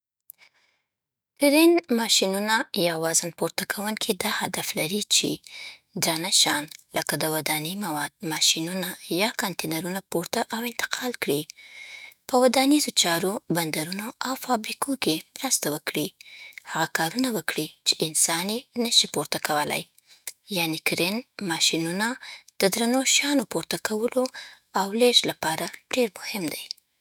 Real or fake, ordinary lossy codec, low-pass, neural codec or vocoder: fake; none; none; autoencoder, 48 kHz, 128 numbers a frame, DAC-VAE, trained on Japanese speech